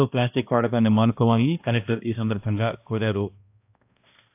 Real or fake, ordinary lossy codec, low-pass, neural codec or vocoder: fake; AAC, 32 kbps; 3.6 kHz; codec, 16 kHz, 1 kbps, X-Codec, HuBERT features, trained on balanced general audio